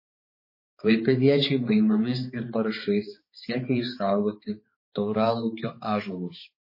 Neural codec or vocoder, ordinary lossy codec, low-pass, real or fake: codec, 16 kHz, 4 kbps, X-Codec, HuBERT features, trained on balanced general audio; MP3, 24 kbps; 5.4 kHz; fake